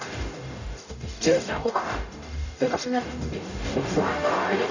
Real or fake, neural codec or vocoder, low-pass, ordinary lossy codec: fake; codec, 44.1 kHz, 0.9 kbps, DAC; 7.2 kHz; none